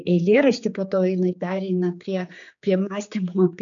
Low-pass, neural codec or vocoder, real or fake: 7.2 kHz; codec, 16 kHz, 4 kbps, X-Codec, HuBERT features, trained on general audio; fake